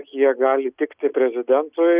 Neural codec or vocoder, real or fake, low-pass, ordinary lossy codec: none; real; 3.6 kHz; Opus, 64 kbps